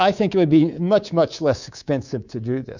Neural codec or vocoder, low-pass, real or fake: codec, 24 kHz, 3.1 kbps, DualCodec; 7.2 kHz; fake